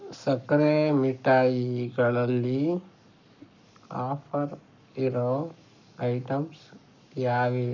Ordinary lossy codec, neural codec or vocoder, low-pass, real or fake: none; codec, 44.1 kHz, 7.8 kbps, Pupu-Codec; 7.2 kHz; fake